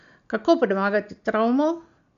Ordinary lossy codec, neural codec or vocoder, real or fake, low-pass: none; none; real; 7.2 kHz